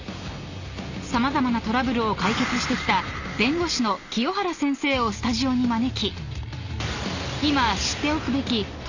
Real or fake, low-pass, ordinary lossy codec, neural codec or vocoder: real; 7.2 kHz; none; none